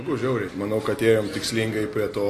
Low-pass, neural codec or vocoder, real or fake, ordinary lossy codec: 14.4 kHz; none; real; AAC, 48 kbps